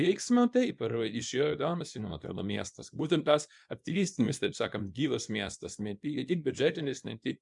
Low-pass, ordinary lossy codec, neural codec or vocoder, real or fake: 10.8 kHz; MP3, 96 kbps; codec, 24 kHz, 0.9 kbps, WavTokenizer, small release; fake